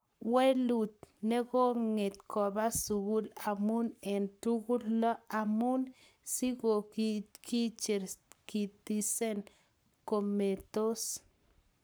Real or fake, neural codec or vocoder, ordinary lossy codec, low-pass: fake; codec, 44.1 kHz, 7.8 kbps, Pupu-Codec; none; none